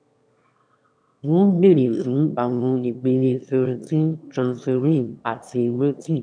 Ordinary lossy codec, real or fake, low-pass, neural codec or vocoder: none; fake; 9.9 kHz; autoencoder, 22.05 kHz, a latent of 192 numbers a frame, VITS, trained on one speaker